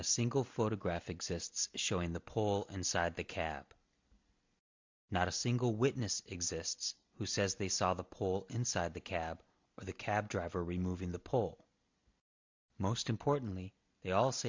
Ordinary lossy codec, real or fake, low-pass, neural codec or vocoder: MP3, 64 kbps; real; 7.2 kHz; none